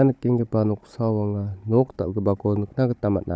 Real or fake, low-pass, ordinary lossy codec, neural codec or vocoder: fake; none; none; codec, 16 kHz, 16 kbps, FunCodec, trained on Chinese and English, 50 frames a second